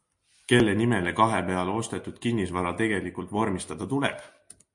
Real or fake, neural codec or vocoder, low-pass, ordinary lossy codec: real; none; 10.8 kHz; MP3, 48 kbps